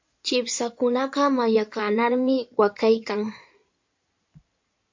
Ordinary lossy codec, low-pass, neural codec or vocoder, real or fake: MP3, 48 kbps; 7.2 kHz; codec, 16 kHz in and 24 kHz out, 2.2 kbps, FireRedTTS-2 codec; fake